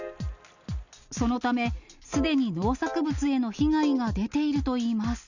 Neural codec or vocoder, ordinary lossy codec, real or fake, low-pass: none; none; real; 7.2 kHz